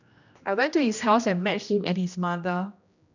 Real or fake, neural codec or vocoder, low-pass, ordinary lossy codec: fake; codec, 16 kHz, 1 kbps, X-Codec, HuBERT features, trained on general audio; 7.2 kHz; none